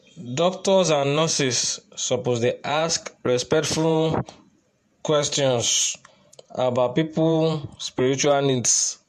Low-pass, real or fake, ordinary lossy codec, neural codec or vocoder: 14.4 kHz; fake; MP3, 64 kbps; vocoder, 48 kHz, 128 mel bands, Vocos